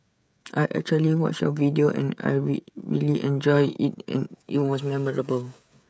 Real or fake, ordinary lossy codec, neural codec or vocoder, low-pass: fake; none; codec, 16 kHz, 16 kbps, FreqCodec, smaller model; none